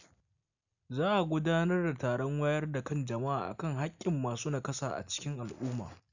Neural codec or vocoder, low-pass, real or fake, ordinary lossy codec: none; 7.2 kHz; real; none